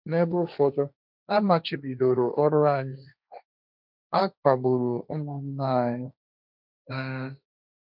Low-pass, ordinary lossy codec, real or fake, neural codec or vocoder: 5.4 kHz; none; fake; codec, 16 kHz, 1.1 kbps, Voila-Tokenizer